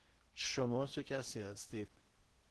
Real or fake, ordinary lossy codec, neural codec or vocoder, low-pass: fake; Opus, 16 kbps; codec, 16 kHz in and 24 kHz out, 0.8 kbps, FocalCodec, streaming, 65536 codes; 10.8 kHz